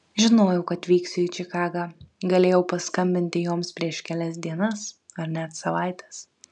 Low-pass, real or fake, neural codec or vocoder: 10.8 kHz; real; none